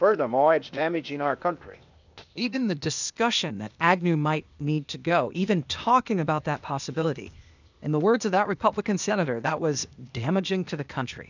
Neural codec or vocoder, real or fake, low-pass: codec, 16 kHz, 0.8 kbps, ZipCodec; fake; 7.2 kHz